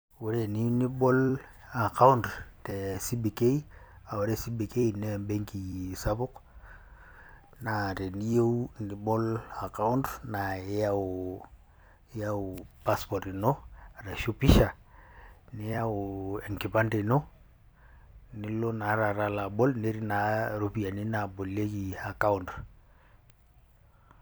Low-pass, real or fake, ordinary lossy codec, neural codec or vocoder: none; fake; none; vocoder, 44.1 kHz, 128 mel bands every 256 samples, BigVGAN v2